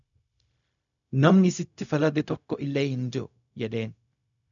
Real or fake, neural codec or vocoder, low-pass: fake; codec, 16 kHz, 0.4 kbps, LongCat-Audio-Codec; 7.2 kHz